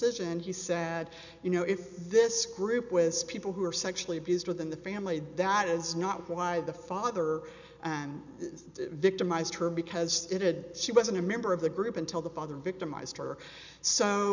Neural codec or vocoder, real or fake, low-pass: none; real; 7.2 kHz